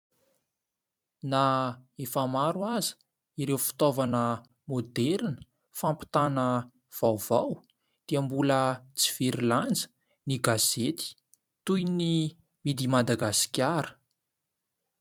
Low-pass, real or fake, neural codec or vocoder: 19.8 kHz; fake; vocoder, 44.1 kHz, 128 mel bands every 256 samples, BigVGAN v2